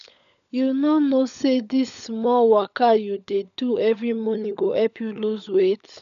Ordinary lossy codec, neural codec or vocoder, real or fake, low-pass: none; codec, 16 kHz, 16 kbps, FunCodec, trained on LibriTTS, 50 frames a second; fake; 7.2 kHz